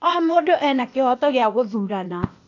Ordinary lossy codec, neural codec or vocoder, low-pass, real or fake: AAC, 48 kbps; codec, 16 kHz, 0.8 kbps, ZipCodec; 7.2 kHz; fake